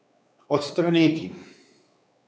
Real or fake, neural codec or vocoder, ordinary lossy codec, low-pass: fake; codec, 16 kHz, 4 kbps, X-Codec, WavLM features, trained on Multilingual LibriSpeech; none; none